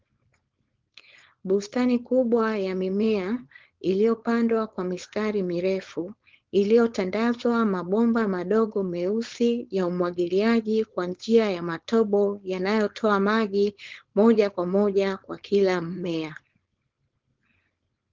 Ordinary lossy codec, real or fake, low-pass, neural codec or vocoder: Opus, 16 kbps; fake; 7.2 kHz; codec, 16 kHz, 4.8 kbps, FACodec